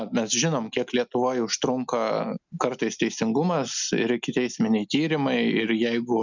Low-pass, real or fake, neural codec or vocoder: 7.2 kHz; real; none